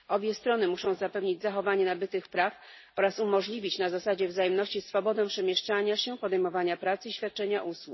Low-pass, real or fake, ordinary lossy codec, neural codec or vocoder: 7.2 kHz; real; MP3, 24 kbps; none